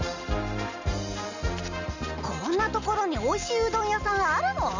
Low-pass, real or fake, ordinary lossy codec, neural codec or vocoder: 7.2 kHz; real; none; none